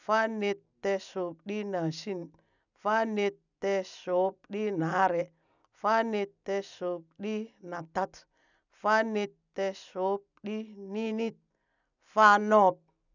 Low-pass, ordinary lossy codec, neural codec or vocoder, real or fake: 7.2 kHz; none; none; real